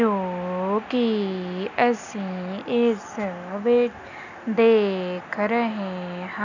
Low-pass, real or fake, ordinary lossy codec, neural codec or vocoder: 7.2 kHz; real; none; none